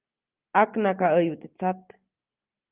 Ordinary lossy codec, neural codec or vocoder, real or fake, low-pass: Opus, 24 kbps; none; real; 3.6 kHz